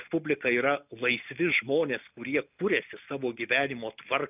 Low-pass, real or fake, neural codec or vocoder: 3.6 kHz; real; none